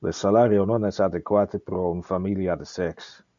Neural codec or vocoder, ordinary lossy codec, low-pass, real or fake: none; MP3, 96 kbps; 7.2 kHz; real